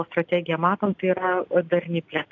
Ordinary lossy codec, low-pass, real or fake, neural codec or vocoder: AAC, 48 kbps; 7.2 kHz; real; none